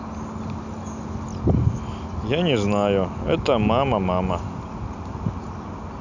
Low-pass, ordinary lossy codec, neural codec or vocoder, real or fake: 7.2 kHz; none; none; real